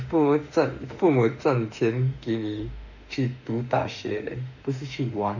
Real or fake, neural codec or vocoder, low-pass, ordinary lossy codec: fake; autoencoder, 48 kHz, 32 numbers a frame, DAC-VAE, trained on Japanese speech; 7.2 kHz; none